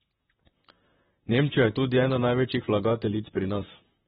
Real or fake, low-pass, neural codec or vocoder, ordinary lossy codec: fake; 19.8 kHz; vocoder, 44.1 kHz, 128 mel bands, Pupu-Vocoder; AAC, 16 kbps